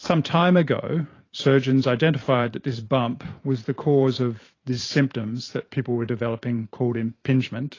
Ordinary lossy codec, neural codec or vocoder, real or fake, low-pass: AAC, 32 kbps; vocoder, 44.1 kHz, 128 mel bands every 512 samples, BigVGAN v2; fake; 7.2 kHz